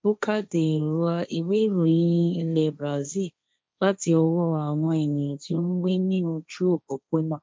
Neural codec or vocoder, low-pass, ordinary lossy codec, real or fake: codec, 16 kHz, 1.1 kbps, Voila-Tokenizer; none; none; fake